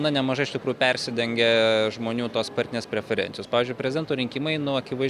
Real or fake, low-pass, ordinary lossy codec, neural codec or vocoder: real; 14.4 kHz; Opus, 64 kbps; none